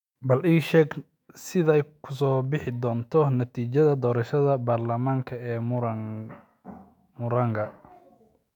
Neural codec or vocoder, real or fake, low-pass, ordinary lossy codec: autoencoder, 48 kHz, 128 numbers a frame, DAC-VAE, trained on Japanese speech; fake; 19.8 kHz; MP3, 96 kbps